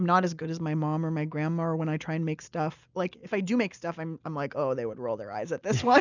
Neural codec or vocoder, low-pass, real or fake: none; 7.2 kHz; real